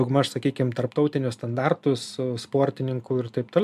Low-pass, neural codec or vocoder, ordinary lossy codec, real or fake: 14.4 kHz; none; MP3, 96 kbps; real